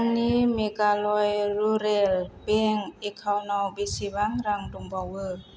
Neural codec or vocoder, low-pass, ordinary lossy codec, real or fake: none; none; none; real